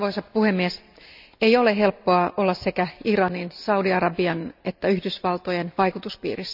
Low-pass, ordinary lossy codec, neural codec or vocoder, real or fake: 5.4 kHz; none; none; real